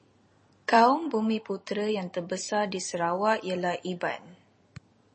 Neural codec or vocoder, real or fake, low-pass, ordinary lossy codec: vocoder, 44.1 kHz, 128 mel bands every 256 samples, BigVGAN v2; fake; 10.8 kHz; MP3, 32 kbps